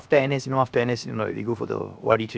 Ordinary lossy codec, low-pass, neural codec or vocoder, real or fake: none; none; codec, 16 kHz, 0.7 kbps, FocalCodec; fake